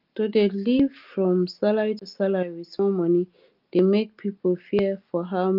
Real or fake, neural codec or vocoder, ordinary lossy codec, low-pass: real; none; Opus, 32 kbps; 5.4 kHz